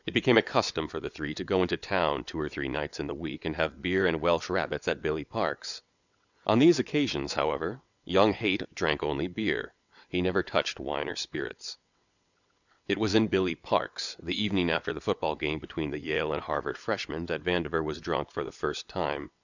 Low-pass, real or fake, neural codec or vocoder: 7.2 kHz; fake; vocoder, 22.05 kHz, 80 mel bands, WaveNeXt